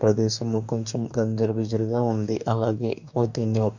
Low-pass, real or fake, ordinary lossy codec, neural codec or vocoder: 7.2 kHz; fake; none; codec, 44.1 kHz, 2.6 kbps, DAC